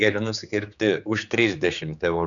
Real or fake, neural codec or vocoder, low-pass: fake; codec, 16 kHz, 4 kbps, X-Codec, HuBERT features, trained on general audio; 7.2 kHz